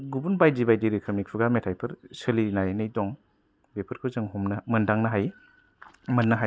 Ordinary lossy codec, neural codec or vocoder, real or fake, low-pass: none; none; real; none